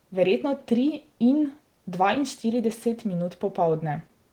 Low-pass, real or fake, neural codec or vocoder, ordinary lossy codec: 19.8 kHz; real; none; Opus, 16 kbps